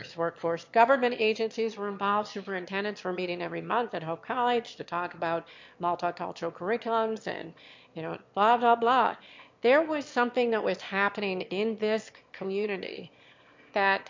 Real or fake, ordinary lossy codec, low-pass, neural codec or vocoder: fake; MP3, 48 kbps; 7.2 kHz; autoencoder, 22.05 kHz, a latent of 192 numbers a frame, VITS, trained on one speaker